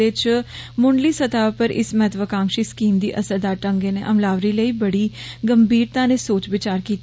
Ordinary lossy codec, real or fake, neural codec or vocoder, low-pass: none; real; none; none